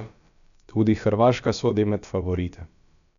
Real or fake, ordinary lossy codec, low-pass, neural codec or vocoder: fake; none; 7.2 kHz; codec, 16 kHz, about 1 kbps, DyCAST, with the encoder's durations